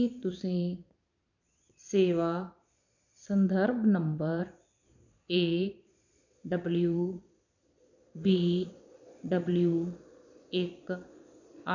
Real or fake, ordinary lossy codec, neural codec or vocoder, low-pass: fake; none; vocoder, 22.05 kHz, 80 mel bands, Vocos; 7.2 kHz